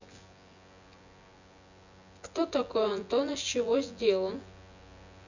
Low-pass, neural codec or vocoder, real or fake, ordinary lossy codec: 7.2 kHz; vocoder, 24 kHz, 100 mel bands, Vocos; fake; none